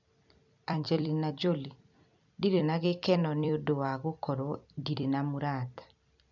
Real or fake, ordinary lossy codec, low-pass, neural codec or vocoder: fake; none; 7.2 kHz; vocoder, 44.1 kHz, 128 mel bands every 256 samples, BigVGAN v2